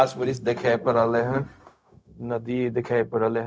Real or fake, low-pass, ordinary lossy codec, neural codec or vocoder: fake; none; none; codec, 16 kHz, 0.4 kbps, LongCat-Audio-Codec